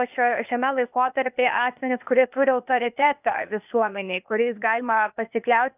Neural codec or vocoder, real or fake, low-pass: codec, 16 kHz, 0.8 kbps, ZipCodec; fake; 3.6 kHz